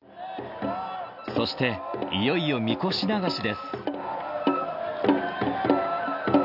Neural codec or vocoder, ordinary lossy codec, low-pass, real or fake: none; none; 5.4 kHz; real